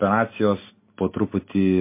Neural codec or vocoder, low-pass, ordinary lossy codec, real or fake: none; 3.6 kHz; MP3, 24 kbps; real